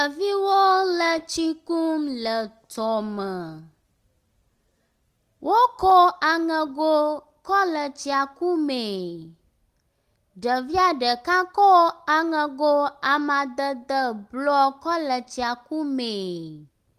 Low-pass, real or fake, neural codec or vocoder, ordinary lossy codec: 14.4 kHz; real; none; Opus, 24 kbps